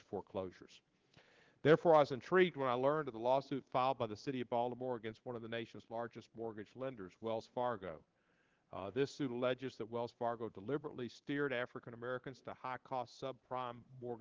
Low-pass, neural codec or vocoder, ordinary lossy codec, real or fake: 7.2 kHz; codec, 24 kHz, 3.1 kbps, DualCodec; Opus, 16 kbps; fake